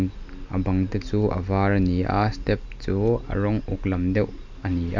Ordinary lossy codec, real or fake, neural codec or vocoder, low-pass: MP3, 64 kbps; real; none; 7.2 kHz